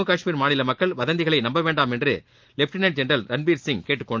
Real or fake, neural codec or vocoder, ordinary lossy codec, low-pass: real; none; Opus, 32 kbps; 7.2 kHz